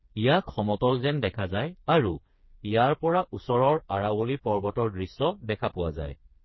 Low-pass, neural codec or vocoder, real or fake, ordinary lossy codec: 7.2 kHz; codec, 16 kHz, 4 kbps, FreqCodec, smaller model; fake; MP3, 24 kbps